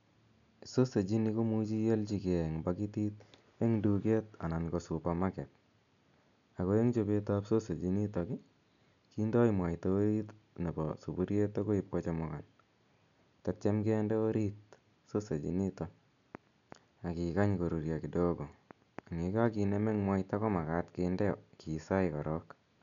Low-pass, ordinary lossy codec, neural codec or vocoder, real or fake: 7.2 kHz; none; none; real